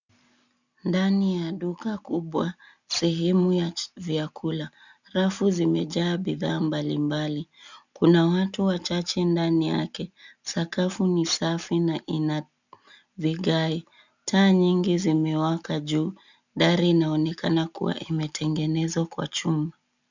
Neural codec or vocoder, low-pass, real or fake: none; 7.2 kHz; real